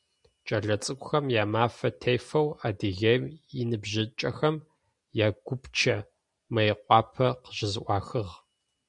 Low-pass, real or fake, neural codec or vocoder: 10.8 kHz; real; none